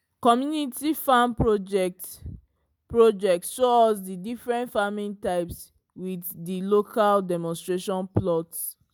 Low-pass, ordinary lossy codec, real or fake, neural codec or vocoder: none; none; real; none